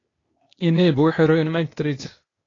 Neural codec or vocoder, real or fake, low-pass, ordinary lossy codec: codec, 16 kHz, 0.8 kbps, ZipCodec; fake; 7.2 kHz; AAC, 32 kbps